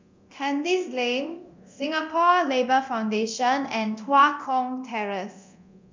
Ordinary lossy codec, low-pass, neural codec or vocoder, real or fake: MP3, 64 kbps; 7.2 kHz; codec, 24 kHz, 0.9 kbps, DualCodec; fake